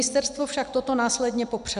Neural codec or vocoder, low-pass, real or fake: none; 10.8 kHz; real